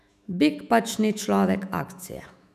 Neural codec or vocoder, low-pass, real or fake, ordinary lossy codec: autoencoder, 48 kHz, 128 numbers a frame, DAC-VAE, trained on Japanese speech; 14.4 kHz; fake; none